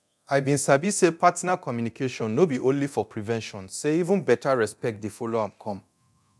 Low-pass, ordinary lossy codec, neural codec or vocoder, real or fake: none; none; codec, 24 kHz, 0.9 kbps, DualCodec; fake